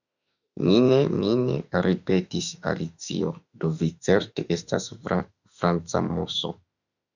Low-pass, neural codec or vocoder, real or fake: 7.2 kHz; autoencoder, 48 kHz, 32 numbers a frame, DAC-VAE, trained on Japanese speech; fake